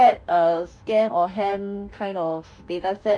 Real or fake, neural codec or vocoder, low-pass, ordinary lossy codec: fake; autoencoder, 48 kHz, 32 numbers a frame, DAC-VAE, trained on Japanese speech; 9.9 kHz; none